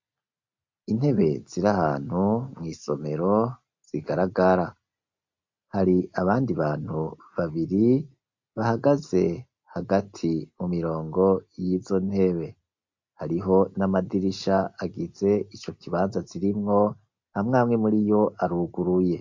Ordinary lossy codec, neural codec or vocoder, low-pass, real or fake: MP3, 48 kbps; none; 7.2 kHz; real